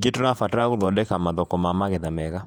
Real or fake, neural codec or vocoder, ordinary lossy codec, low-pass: fake; vocoder, 48 kHz, 128 mel bands, Vocos; none; 19.8 kHz